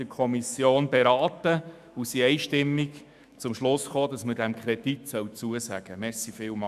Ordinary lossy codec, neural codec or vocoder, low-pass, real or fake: none; autoencoder, 48 kHz, 128 numbers a frame, DAC-VAE, trained on Japanese speech; 14.4 kHz; fake